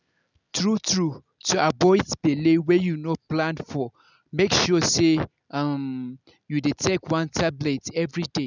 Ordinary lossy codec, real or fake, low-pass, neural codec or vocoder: none; real; 7.2 kHz; none